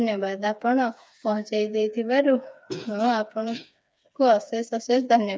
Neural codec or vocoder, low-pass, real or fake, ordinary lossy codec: codec, 16 kHz, 4 kbps, FreqCodec, smaller model; none; fake; none